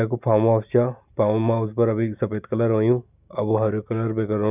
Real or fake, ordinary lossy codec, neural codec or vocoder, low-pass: real; none; none; 3.6 kHz